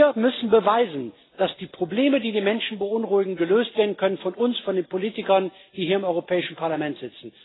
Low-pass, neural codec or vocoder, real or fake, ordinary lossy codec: 7.2 kHz; none; real; AAC, 16 kbps